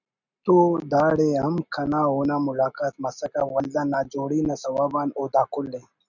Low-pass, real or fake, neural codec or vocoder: 7.2 kHz; real; none